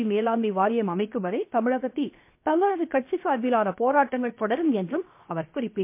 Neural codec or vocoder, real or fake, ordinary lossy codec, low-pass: codec, 16 kHz, 0.7 kbps, FocalCodec; fake; MP3, 24 kbps; 3.6 kHz